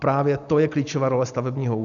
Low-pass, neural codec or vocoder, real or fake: 7.2 kHz; none; real